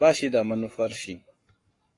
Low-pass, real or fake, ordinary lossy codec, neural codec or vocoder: 10.8 kHz; fake; AAC, 32 kbps; codec, 44.1 kHz, 7.8 kbps, Pupu-Codec